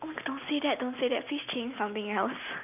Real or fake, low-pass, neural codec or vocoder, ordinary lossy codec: real; 3.6 kHz; none; none